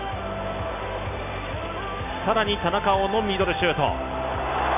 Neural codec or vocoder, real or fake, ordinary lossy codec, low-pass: none; real; none; 3.6 kHz